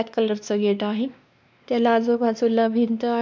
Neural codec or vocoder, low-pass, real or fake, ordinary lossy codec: codec, 16 kHz, 2 kbps, X-Codec, WavLM features, trained on Multilingual LibriSpeech; none; fake; none